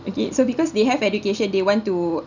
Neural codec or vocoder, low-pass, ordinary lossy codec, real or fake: none; 7.2 kHz; none; real